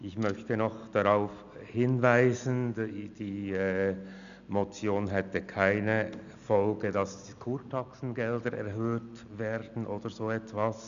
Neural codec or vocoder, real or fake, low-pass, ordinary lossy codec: none; real; 7.2 kHz; none